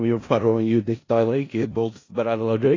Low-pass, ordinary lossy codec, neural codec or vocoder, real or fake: 7.2 kHz; AAC, 32 kbps; codec, 16 kHz in and 24 kHz out, 0.4 kbps, LongCat-Audio-Codec, four codebook decoder; fake